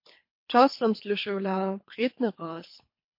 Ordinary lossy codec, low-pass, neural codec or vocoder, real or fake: MP3, 32 kbps; 5.4 kHz; codec, 24 kHz, 3 kbps, HILCodec; fake